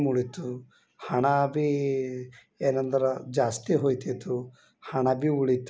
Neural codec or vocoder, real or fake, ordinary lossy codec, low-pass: none; real; none; none